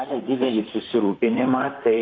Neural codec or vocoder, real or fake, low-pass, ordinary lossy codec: vocoder, 44.1 kHz, 128 mel bands, Pupu-Vocoder; fake; 7.2 kHz; AAC, 32 kbps